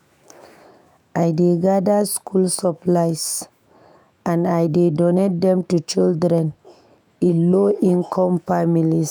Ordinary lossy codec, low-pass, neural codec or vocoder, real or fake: none; none; autoencoder, 48 kHz, 128 numbers a frame, DAC-VAE, trained on Japanese speech; fake